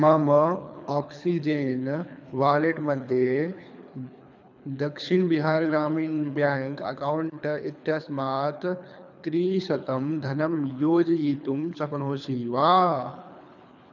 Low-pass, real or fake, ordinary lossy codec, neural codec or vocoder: 7.2 kHz; fake; none; codec, 24 kHz, 3 kbps, HILCodec